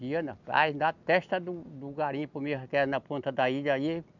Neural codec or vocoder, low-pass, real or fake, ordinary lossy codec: none; 7.2 kHz; real; none